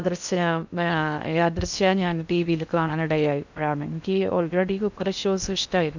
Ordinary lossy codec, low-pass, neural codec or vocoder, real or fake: none; 7.2 kHz; codec, 16 kHz in and 24 kHz out, 0.6 kbps, FocalCodec, streaming, 2048 codes; fake